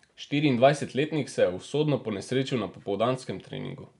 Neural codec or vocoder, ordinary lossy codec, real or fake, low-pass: none; none; real; 10.8 kHz